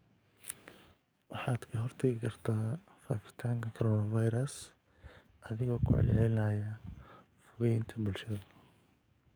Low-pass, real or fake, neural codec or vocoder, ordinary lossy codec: none; fake; codec, 44.1 kHz, 7.8 kbps, DAC; none